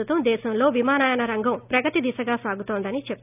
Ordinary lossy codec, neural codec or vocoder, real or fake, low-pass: none; none; real; 3.6 kHz